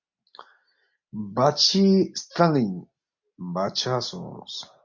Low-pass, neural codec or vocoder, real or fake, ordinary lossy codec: 7.2 kHz; none; real; MP3, 64 kbps